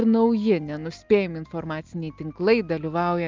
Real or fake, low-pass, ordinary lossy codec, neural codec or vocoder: real; 7.2 kHz; Opus, 24 kbps; none